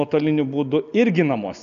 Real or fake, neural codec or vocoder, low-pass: real; none; 7.2 kHz